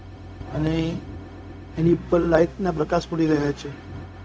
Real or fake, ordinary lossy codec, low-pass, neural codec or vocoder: fake; none; none; codec, 16 kHz, 0.4 kbps, LongCat-Audio-Codec